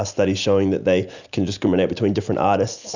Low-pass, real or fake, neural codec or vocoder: 7.2 kHz; real; none